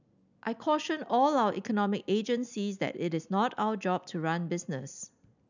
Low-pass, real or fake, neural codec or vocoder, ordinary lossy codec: 7.2 kHz; real; none; none